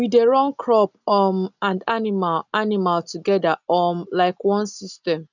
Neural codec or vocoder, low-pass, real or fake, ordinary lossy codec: none; 7.2 kHz; real; none